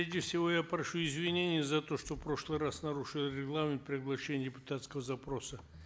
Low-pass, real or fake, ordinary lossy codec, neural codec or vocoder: none; real; none; none